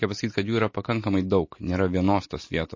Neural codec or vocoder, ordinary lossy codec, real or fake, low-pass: none; MP3, 32 kbps; real; 7.2 kHz